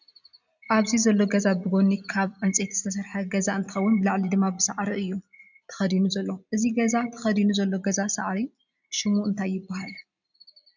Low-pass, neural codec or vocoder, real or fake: 7.2 kHz; none; real